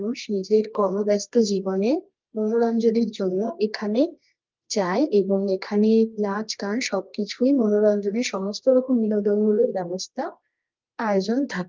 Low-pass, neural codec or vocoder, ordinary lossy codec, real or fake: 7.2 kHz; codec, 24 kHz, 0.9 kbps, WavTokenizer, medium music audio release; Opus, 32 kbps; fake